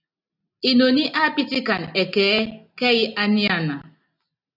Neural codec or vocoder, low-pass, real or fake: none; 5.4 kHz; real